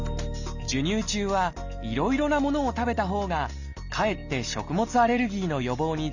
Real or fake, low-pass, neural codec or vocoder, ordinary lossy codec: real; 7.2 kHz; none; Opus, 64 kbps